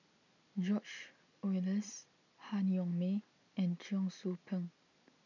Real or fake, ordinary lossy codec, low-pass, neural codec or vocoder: real; none; 7.2 kHz; none